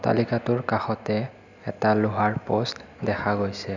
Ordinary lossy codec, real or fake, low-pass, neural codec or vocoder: none; real; 7.2 kHz; none